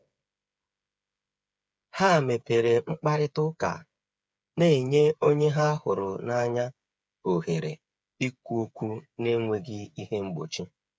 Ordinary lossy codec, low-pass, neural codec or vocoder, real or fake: none; none; codec, 16 kHz, 8 kbps, FreqCodec, smaller model; fake